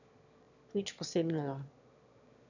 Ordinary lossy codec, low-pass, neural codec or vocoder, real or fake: none; 7.2 kHz; autoencoder, 22.05 kHz, a latent of 192 numbers a frame, VITS, trained on one speaker; fake